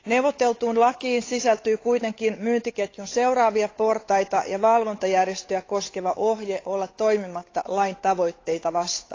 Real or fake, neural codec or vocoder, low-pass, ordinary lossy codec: fake; codec, 16 kHz, 8 kbps, FunCodec, trained on Chinese and English, 25 frames a second; 7.2 kHz; AAC, 32 kbps